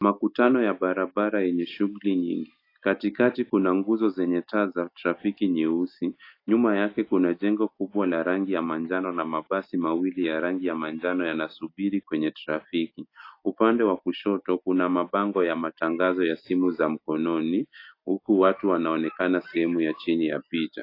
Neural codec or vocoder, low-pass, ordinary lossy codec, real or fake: none; 5.4 kHz; AAC, 32 kbps; real